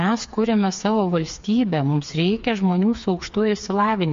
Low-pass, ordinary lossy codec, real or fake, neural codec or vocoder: 7.2 kHz; MP3, 48 kbps; fake; codec, 16 kHz, 4 kbps, FreqCodec, smaller model